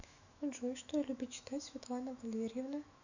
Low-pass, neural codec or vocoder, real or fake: 7.2 kHz; autoencoder, 48 kHz, 128 numbers a frame, DAC-VAE, trained on Japanese speech; fake